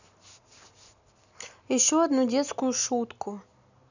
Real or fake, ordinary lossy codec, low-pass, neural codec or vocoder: real; none; 7.2 kHz; none